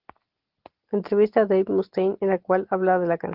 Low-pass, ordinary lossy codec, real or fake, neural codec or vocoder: 5.4 kHz; Opus, 16 kbps; real; none